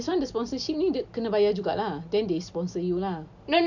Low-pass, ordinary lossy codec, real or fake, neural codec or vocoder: 7.2 kHz; none; real; none